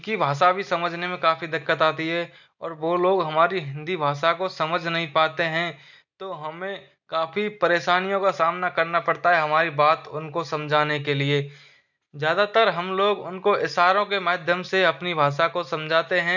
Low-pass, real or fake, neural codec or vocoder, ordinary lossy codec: 7.2 kHz; real; none; none